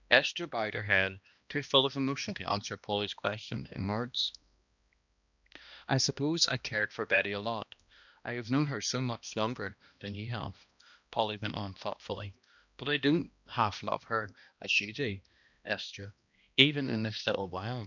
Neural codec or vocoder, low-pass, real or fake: codec, 16 kHz, 1 kbps, X-Codec, HuBERT features, trained on balanced general audio; 7.2 kHz; fake